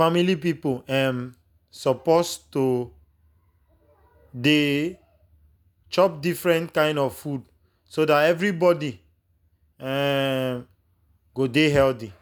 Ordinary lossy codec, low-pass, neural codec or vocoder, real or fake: none; none; none; real